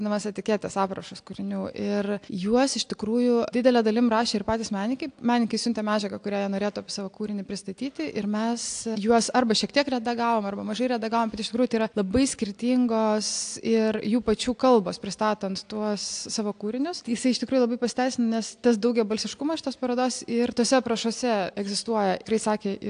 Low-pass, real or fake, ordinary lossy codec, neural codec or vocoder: 9.9 kHz; real; AAC, 64 kbps; none